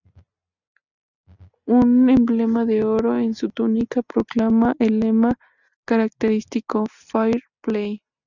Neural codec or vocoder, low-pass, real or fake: none; 7.2 kHz; real